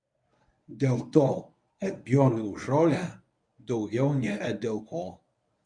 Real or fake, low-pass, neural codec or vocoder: fake; 9.9 kHz; codec, 24 kHz, 0.9 kbps, WavTokenizer, medium speech release version 1